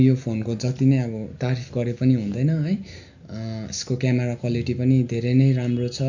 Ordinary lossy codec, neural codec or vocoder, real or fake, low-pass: none; none; real; 7.2 kHz